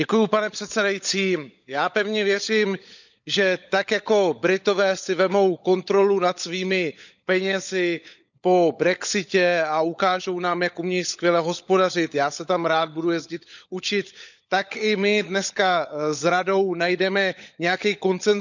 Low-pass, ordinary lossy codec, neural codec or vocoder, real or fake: 7.2 kHz; none; codec, 16 kHz, 16 kbps, FunCodec, trained on LibriTTS, 50 frames a second; fake